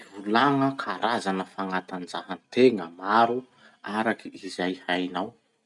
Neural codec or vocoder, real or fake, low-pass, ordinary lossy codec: none; real; 10.8 kHz; none